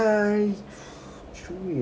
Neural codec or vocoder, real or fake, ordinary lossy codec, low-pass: none; real; none; none